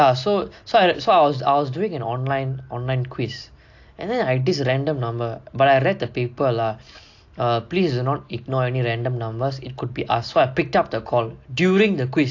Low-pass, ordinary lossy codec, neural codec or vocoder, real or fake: 7.2 kHz; AAC, 48 kbps; none; real